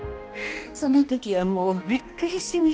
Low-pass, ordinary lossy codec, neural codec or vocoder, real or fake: none; none; codec, 16 kHz, 1 kbps, X-Codec, HuBERT features, trained on balanced general audio; fake